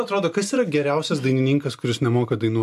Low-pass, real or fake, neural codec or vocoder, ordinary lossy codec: 14.4 kHz; real; none; AAC, 96 kbps